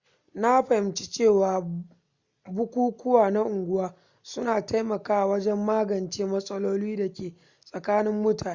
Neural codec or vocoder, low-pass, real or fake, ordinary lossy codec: none; 7.2 kHz; real; Opus, 64 kbps